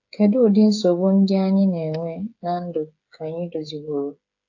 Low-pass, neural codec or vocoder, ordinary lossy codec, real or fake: 7.2 kHz; codec, 16 kHz, 8 kbps, FreqCodec, smaller model; AAC, 48 kbps; fake